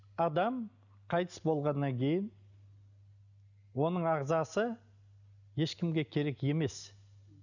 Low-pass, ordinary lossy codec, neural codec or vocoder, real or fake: 7.2 kHz; none; none; real